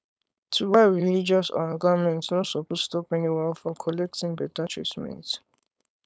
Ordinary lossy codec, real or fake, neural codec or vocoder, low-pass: none; fake; codec, 16 kHz, 4.8 kbps, FACodec; none